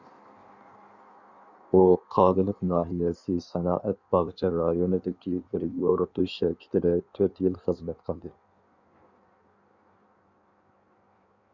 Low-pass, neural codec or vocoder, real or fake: 7.2 kHz; codec, 16 kHz in and 24 kHz out, 1.1 kbps, FireRedTTS-2 codec; fake